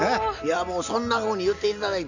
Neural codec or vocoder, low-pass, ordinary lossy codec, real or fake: none; 7.2 kHz; none; real